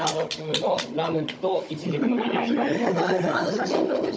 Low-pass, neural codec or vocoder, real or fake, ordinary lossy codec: none; codec, 16 kHz, 16 kbps, FunCodec, trained on Chinese and English, 50 frames a second; fake; none